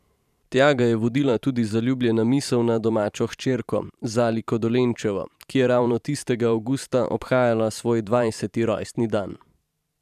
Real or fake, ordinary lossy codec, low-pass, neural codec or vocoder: fake; none; 14.4 kHz; vocoder, 44.1 kHz, 128 mel bands every 512 samples, BigVGAN v2